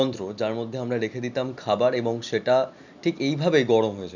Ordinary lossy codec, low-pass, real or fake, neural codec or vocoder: none; 7.2 kHz; real; none